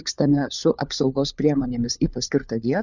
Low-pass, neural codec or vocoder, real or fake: 7.2 kHz; codec, 16 kHz, 4 kbps, FunCodec, trained on LibriTTS, 50 frames a second; fake